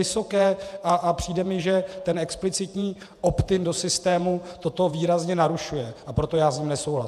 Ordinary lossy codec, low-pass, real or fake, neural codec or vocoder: AAC, 96 kbps; 14.4 kHz; fake; vocoder, 48 kHz, 128 mel bands, Vocos